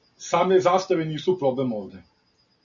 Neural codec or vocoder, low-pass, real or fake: none; 7.2 kHz; real